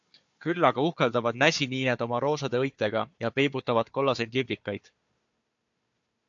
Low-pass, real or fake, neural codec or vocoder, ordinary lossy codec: 7.2 kHz; fake; codec, 16 kHz, 4 kbps, FunCodec, trained on Chinese and English, 50 frames a second; AAC, 64 kbps